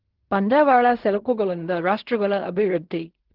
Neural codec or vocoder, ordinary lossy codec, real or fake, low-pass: codec, 16 kHz in and 24 kHz out, 0.4 kbps, LongCat-Audio-Codec, fine tuned four codebook decoder; Opus, 16 kbps; fake; 5.4 kHz